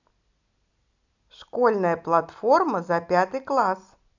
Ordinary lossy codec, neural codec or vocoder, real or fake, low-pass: none; none; real; 7.2 kHz